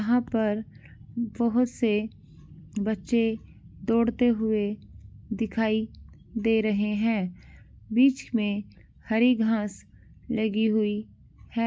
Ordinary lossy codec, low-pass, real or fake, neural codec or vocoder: none; none; real; none